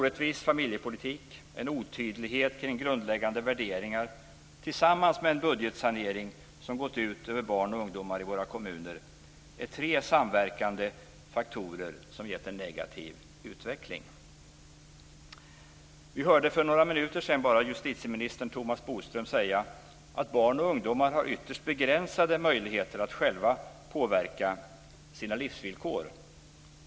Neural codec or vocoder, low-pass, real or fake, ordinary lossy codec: none; none; real; none